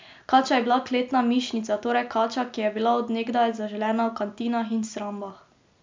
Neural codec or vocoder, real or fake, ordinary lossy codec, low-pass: none; real; MP3, 64 kbps; 7.2 kHz